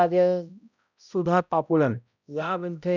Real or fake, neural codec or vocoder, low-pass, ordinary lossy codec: fake; codec, 16 kHz, 0.5 kbps, X-Codec, HuBERT features, trained on balanced general audio; 7.2 kHz; none